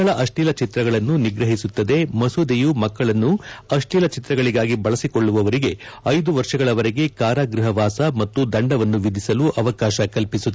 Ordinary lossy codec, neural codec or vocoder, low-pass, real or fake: none; none; none; real